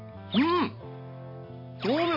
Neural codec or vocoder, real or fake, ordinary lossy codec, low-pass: none; real; MP3, 24 kbps; 5.4 kHz